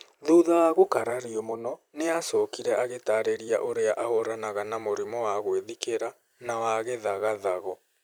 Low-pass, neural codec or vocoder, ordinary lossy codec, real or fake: none; vocoder, 44.1 kHz, 128 mel bands every 256 samples, BigVGAN v2; none; fake